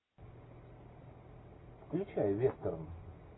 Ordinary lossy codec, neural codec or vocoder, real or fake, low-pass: AAC, 16 kbps; none; real; 7.2 kHz